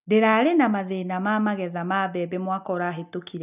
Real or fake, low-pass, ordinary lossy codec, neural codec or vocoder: real; 3.6 kHz; none; none